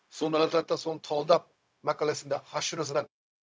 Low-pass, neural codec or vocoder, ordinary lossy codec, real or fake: none; codec, 16 kHz, 0.4 kbps, LongCat-Audio-Codec; none; fake